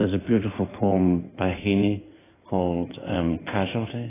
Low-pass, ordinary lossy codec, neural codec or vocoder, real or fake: 3.6 kHz; AAC, 16 kbps; codec, 16 kHz in and 24 kHz out, 2.2 kbps, FireRedTTS-2 codec; fake